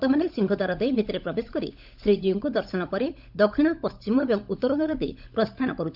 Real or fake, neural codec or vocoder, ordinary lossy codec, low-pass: fake; codec, 16 kHz, 16 kbps, FunCodec, trained on LibriTTS, 50 frames a second; none; 5.4 kHz